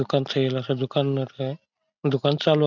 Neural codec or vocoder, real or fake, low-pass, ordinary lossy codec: none; real; 7.2 kHz; none